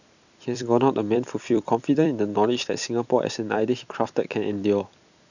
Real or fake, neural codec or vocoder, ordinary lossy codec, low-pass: fake; vocoder, 44.1 kHz, 128 mel bands every 256 samples, BigVGAN v2; none; 7.2 kHz